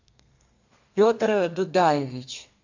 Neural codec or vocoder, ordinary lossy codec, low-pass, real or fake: codec, 32 kHz, 1.9 kbps, SNAC; AAC, 48 kbps; 7.2 kHz; fake